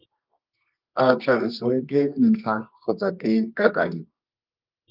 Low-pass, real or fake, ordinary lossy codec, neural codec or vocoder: 5.4 kHz; fake; Opus, 24 kbps; codec, 24 kHz, 0.9 kbps, WavTokenizer, medium music audio release